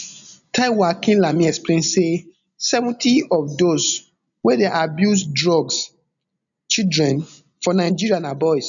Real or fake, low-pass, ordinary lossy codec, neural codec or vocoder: real; 7.2 kHz; none; none